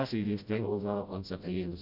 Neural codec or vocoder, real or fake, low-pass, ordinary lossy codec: codec, 16 kHz, 0.5 kbps, FreqCodec, smaller model; fake; 5.4 kHz; none